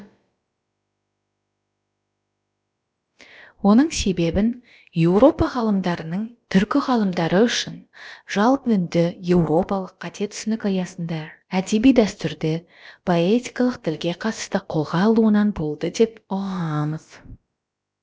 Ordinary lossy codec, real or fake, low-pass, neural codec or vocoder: none; fake; none; codec, 16 kHz, about 1 kbps, DyCAST, with the encoder's durations